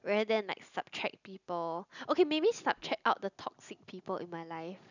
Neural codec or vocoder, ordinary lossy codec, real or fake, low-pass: none; none; real; 7.2 kHz